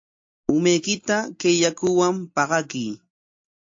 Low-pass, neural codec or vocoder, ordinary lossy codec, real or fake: 7.2 kHz; none; AAC, 48 kbps; real